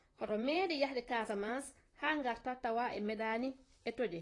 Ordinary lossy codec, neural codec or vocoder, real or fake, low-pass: AAC, 32 kbps; vocoder, 44.1 kHz, 128 mel bands, Pupu-Vocoder; fake; 10.8 kHz